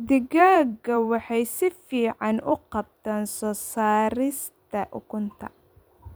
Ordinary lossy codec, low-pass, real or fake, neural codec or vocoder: none; none; fake; vocoder, 44.1 kHz, 128 mel bands every 256 samples, BigVGAN v2